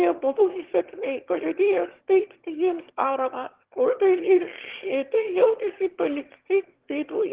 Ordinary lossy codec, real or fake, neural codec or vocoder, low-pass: Opus, 16 kbps; fake; autoencoder, 22.05 kHz, a latent of 192 numbers a frame, VITS, trained on one speaker; 3.6 kHz